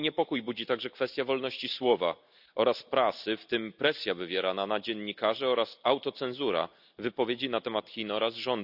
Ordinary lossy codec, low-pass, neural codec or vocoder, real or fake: none; 5.4 kHz; none; real